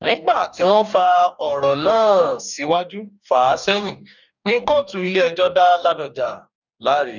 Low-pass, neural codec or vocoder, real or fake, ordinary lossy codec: 7.2 kHz; codec, 44.1 kHz, 2.6 kbps, DAC; fake; none